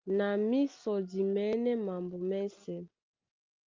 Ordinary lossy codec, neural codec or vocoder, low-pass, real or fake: Opus, 24 kbps; none; 7.2 kHz; real